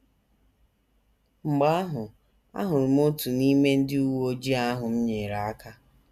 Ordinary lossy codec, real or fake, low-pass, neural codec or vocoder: none; real; 14.4 kHz; none